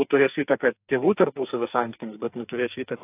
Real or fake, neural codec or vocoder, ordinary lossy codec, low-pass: fake; codec, 44.1 kHz, 2.6 kbps, SNAC; AAC, 24 kbps; 3.6 kHz